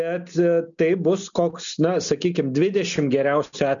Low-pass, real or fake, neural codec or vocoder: 7.2 kHz; real; none